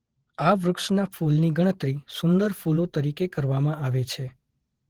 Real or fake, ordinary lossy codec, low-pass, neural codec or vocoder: fake; Opus, 16 kbps; 19.8 kHz; vocoder, 48 kHz, 128 mel bands, Vocos